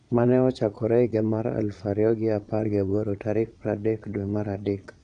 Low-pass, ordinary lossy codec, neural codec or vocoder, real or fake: 9.9 kHz; none; vocoder, 22.05 kHz, 80 mel bands, Vocos; fake